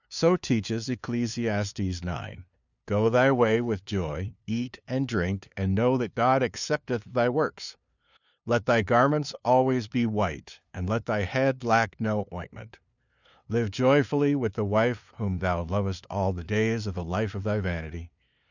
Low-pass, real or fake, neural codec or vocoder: 7.2 kHz; fake; codec, 16 kHz, 2 kbps, FunCodec, trained on LibriTTS, 25 frames a second